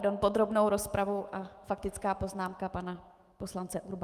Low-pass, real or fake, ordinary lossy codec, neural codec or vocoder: 14.4 kHz; fake; Opus, 32 kbps; autoencoder, 48 kHz, 128 numbers a frame, DAC-VAE, trained on Japanese speech